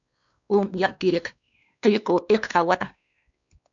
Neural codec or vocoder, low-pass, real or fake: codec, 16 kHz, 2 kbps, X-Codec, WavLM features, trained on Multilingual LibriSpeech; 7.2 kHz; fake